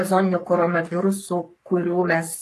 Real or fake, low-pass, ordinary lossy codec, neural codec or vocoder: fake; 14.4 kHz; AAC, 64 kbps; codec, 44.1 kHz, 3.4 kbps, Pupu-Codec